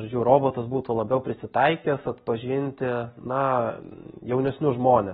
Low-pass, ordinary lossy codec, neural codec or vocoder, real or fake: 7.2 kHz; AAC, 16 kbps; none; real